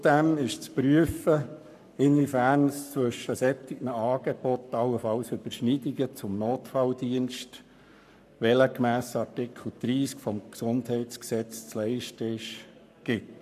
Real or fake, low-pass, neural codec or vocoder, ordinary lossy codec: fake; 14.4 kHz; codec, 44.1 kHz, 7.8 kbps, Pupu-Codec; MP3, 96 kbps